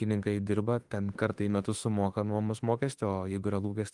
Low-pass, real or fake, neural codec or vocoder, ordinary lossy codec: 10.8 kHz; fake; autoencoder, 48 kHz, 32 numbers a frame, DAC-VAE, trained on Japanese speech; Opus, 24 kbps